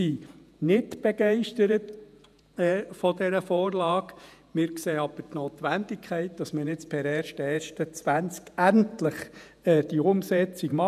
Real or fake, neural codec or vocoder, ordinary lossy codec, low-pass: fake; vocoder, 48 kHz, 128 mel bands, Vocos; none; 14.4 kHz